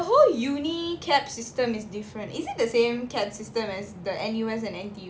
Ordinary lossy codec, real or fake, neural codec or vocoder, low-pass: none; real; none; none